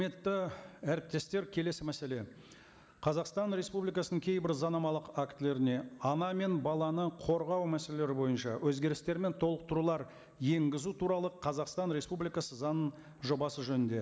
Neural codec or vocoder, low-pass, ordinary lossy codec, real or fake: none; none; none; real